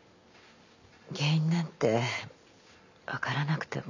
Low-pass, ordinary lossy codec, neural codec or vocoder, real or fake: 7.2 kHz; none; none; real